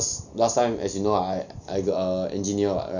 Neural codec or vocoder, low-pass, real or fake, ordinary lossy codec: none; 7.2 kHz; real; none